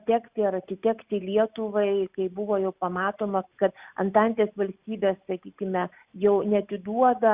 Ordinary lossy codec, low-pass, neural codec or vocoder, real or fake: Opus, 64 kbps; 3.6 kHz; none; real